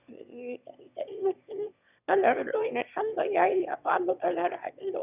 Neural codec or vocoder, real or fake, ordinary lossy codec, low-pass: autoencoder, 22.05 kHz, a latent of 192 numbers a frame, VITS, trained on one speaker; fake; none; 3.6 kHz